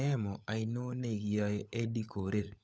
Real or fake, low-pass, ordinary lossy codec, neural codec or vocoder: fake; none; none; codec, 16 kHz, 16 kbps, FunCodec, trained on LibriTTS, 50 frames a second